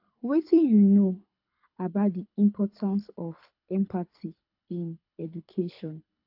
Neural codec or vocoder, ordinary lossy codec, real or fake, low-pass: codec, 24 kHz, 6 kbps, HILCodec; AAC, 48 kbps; fake; 5.4 kHz